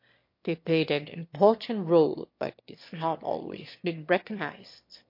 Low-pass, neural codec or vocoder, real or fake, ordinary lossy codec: 5.4 kHz; autoencoder, 22.05 kHz, a latent of 192 numbers a frame, VITS, trained on one speaker; fake; MP3, 32 kbps